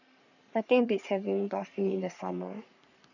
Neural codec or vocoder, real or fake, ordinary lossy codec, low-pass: codec, 44.1 kHz, 3.4 kbps, Pupu-Codec; fake; none; 7.2 kHz